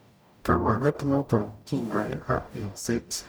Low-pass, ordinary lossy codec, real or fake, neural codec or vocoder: none; none; fake; codec, 44.1 kHz, 0.9 kbps, DAC